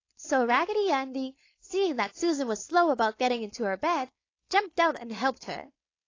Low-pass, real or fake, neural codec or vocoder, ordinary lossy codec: 7.2 kHz; fake; codec, 16 kHz, 4.8 kbps, FACodec; AAC, 32 kbps